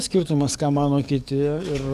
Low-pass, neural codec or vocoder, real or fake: 14.4 kHz; codec, 44.1 kHz, 7.8 kbps, DAC; fake